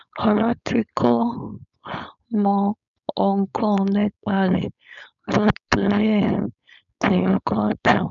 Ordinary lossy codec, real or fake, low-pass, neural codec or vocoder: none; fake; 7.2 kHz; codec, 16 kHz, 4.8 kbps, FACodec